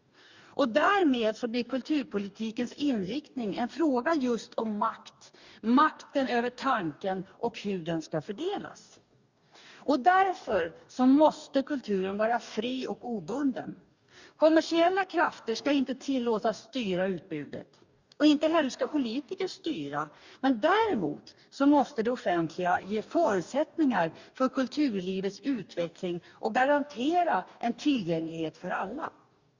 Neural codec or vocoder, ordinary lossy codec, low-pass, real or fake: codec, 44.1 kHz, 2.6 kbps, DAC; Opus, 64 kbps; 7.2 kHz; fake